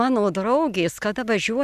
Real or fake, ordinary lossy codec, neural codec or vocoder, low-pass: real; Opus, 64 kbps; none; 14.4 kHz